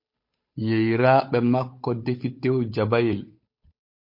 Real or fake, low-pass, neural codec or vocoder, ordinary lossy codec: fake; 5.4 kHz; codec, 16 kHz, 8 kbps, FunCodec, trained on Chinese and English, 25 frames a second; MP3, 32 kbps